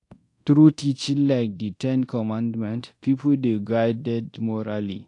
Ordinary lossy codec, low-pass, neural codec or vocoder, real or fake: AAC, 48 kbps; 10.8 kHz; codec, 24 kHz, 0.9 kbps, DualCodec; fake